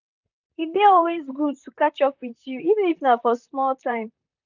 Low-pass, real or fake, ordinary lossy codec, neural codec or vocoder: 7.2 kHz; fake; none; vocoder, 44.1 kHz, 128 mel bands, Pupu-Vocoder